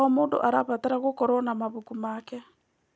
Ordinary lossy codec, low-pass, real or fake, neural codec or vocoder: none; none; real; none